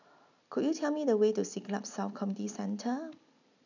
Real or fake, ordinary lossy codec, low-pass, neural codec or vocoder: real; none; 7.2 kHz; none